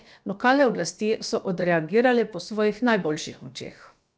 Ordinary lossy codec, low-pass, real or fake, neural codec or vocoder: none; none; fake; codec, 16 kHz, about 1 kbps, DyCAST, with the encoder's durations